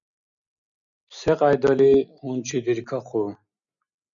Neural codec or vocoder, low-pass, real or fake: none; 7.2 kHz; real